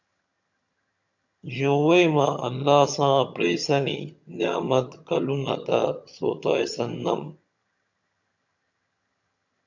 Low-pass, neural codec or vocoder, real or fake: 7.2 kHz; vocoder, 22.05 kHz, 80 mel bands, HiFi-GAN; fake